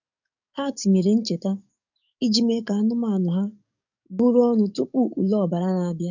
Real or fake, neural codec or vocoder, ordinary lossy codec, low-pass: fake; codec, 44.1 kHz, 7.8 kbps, DAC; none; 7.2 kHz